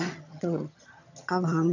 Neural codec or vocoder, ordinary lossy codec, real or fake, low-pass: vocoder, 22.05 kHz, 80 mel bands, HiFi-GAN; none; fake; 7.2 kHz